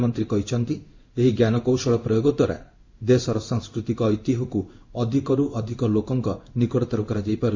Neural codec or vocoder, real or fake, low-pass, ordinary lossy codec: codec, 16 kHz in and 24 kHz out, 1 kbps, XY-Tokenizer; fake; 7.2 kHz; MP3, 64 kbps